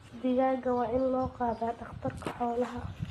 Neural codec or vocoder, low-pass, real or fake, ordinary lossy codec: none; 19.8 kHz; real; AAC, 32 kbps